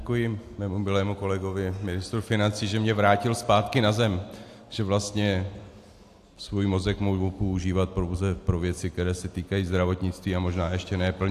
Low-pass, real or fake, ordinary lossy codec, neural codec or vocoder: 14.4 kHz; real; AAC, 64 kbps; none